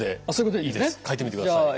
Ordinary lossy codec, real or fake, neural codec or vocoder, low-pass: none; real; none; none